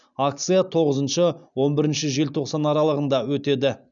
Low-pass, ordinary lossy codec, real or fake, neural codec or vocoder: 7.2 kHz; none; real; none